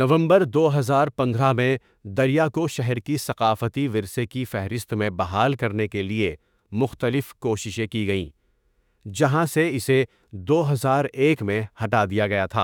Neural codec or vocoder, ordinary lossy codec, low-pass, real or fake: autoencoder, 48 kHz, 32 numbers a frame, DAC-VAE, trained on Japanese speech; none; 19.8 kHz; fake